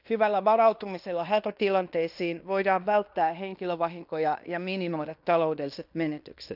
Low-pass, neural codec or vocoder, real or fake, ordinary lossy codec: 5.4 kHz; codec, 16 kHz in and 24 kHz out, 0.9 kbps, LongCat-Audio-Codec, fine tuned four codebook decoder; fake; none